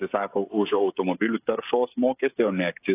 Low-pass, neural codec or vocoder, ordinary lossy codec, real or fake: 3.6 kHz; codec, 16 kHz, 16 kbps, FreqCodec, smaller model; AAC, 24 kbps; fake